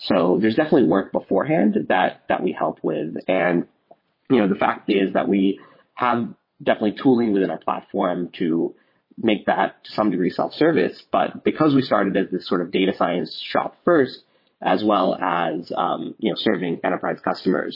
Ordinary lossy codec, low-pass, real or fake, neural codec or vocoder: MP3, 24 kbps; 5.4 kHz; fake; vocoder, 22.05 kHz, 80 mel bands, WaveNeXt